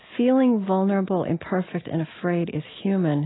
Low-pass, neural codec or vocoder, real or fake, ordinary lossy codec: 7.2 kHz; none; real; AAC, 16 kbps